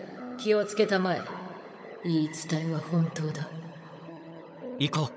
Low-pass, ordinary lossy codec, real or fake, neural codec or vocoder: none; none; fake; codec, 16 kHz, 16 kbps, FunCodec, trained on LibriTTS, 50 frames a second